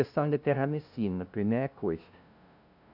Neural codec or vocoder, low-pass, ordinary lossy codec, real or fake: codec, 16 kHz, 0.5 kbps, FunCodec, trained on LibriTTS, 25 frames a second; 5.4 kHz; none; fake